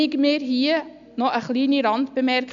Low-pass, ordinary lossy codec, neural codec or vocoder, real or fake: 7.2 kHz; none; none; real